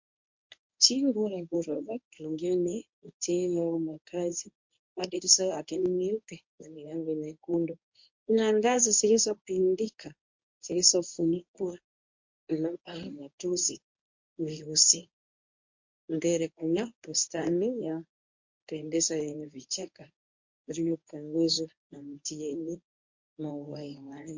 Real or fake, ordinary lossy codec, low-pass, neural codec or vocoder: fake; MP3, 48 kbps; 7.2 kHz; codec, 24 kHz, 0.9 kbps, WavTokenizer, medium speech release version 1